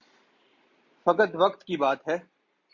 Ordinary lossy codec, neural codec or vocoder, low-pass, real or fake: MP3, 48 kbps; none; 7.2 kHz; real